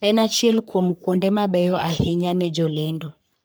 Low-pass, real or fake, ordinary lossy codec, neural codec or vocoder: none; fake; none; codec, 44.1 kHz, 3.4 kbps, Pupu-Codec